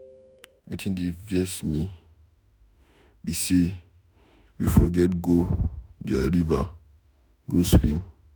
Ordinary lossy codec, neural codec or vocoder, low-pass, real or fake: none; autoencoder, 48 kHz, 32 numbers a frame, DAC-VAE, trained on Japanese speech; none; fake